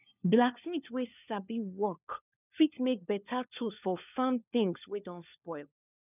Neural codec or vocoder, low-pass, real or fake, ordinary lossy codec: codec, 16 kHz, 4 kbps, FunCodec, trained on LibriTTS, 50 frames a second; 3.6 kHz; fake; none